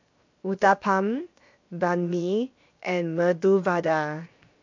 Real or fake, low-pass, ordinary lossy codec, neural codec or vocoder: fake; 7.2 kHz; MP3, 48 kbps; codec, 16 kHz, 0.7 kbps, FocalCodec